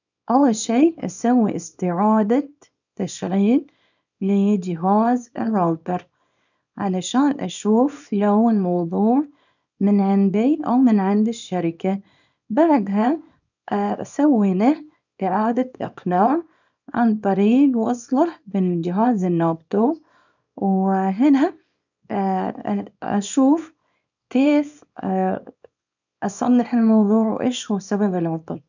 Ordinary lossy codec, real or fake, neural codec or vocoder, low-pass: none; fake; codec, 24 kHz, 0.9 kbps, WavTokenizer, small release; 7.2 kHz